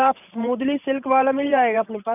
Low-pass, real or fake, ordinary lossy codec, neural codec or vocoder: 3.6 kHz; fake; none; vocoder, 44.1 kHz, 128 mel bands every 512 samples, BigVGAN v2